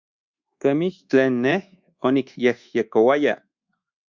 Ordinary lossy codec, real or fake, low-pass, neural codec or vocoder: Opus, 64 kbps; fake; 7.2 kHz; codec, 24 kHz, 1.2 kbps, DualCodec